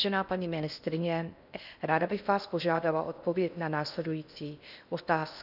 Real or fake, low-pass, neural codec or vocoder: fake; 5.4 kHz; codec, 16 kHz in and 24 kHz out, 0.6 kbps, FocalCodec, streaming, 4096 codes